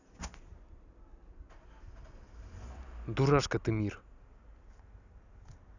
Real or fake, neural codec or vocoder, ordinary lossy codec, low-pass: real; none; none; 7.2 kHz